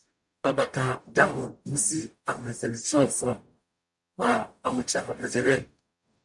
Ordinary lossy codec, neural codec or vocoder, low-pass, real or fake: MP3, 64 kbps; codec, 44.1 kHz, 0.9 kbps, DAC; 10.8 kHz; fake